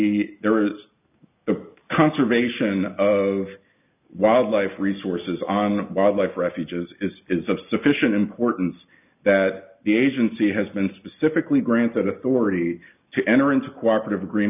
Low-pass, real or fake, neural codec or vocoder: 3.6 kHz; real; none